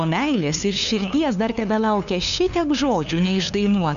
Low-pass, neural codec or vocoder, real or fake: 7.2 kHz; codec, 16 kHz, 2 kbps, FunCodec, trained on LibriTTS, 25 frames a second; fake